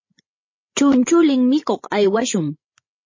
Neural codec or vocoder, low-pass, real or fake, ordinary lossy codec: codec, 16 kHz, 8 kbps, FreqCodec, larger model; 7.2 kHz; fake; MP3, 32 kbps